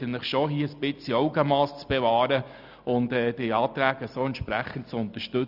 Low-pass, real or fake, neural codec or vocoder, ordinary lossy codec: 5.4 kHz; real; none; none